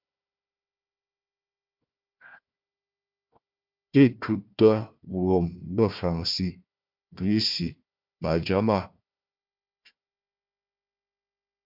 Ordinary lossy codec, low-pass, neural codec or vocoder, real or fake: MP3, 48 kbps; 5.4 kHz; codec, 16 kHz, 1 kbps, FunCodec, trained on Chinese and English, 50 frames a second; fake